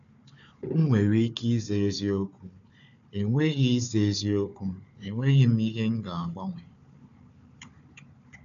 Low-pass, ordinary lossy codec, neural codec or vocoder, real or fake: 7.2 kHz; none; codec, 16 kHz, 4 kbps, FunCodec, trained on Chinese and English, 50 frames a second; fake